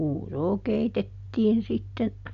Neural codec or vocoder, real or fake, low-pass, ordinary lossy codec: none; real; 7.2 kHz; none